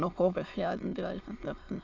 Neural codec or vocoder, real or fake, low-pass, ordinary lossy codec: autoencoder, 22.05 kHz, a latent of 192 numbers a frame, VITS, trained on many speakers; fake; 7.2 kHz; AAC, 48 kbps